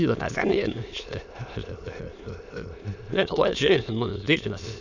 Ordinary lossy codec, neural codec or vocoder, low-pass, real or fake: none; autoencoder, 22.05 kHz, a latent of 192 numbers a frame, VITS, trained on many speakers; 7.2 kHz; fake